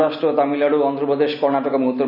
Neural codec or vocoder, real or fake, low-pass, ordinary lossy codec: none; real; 5.4 kHz; none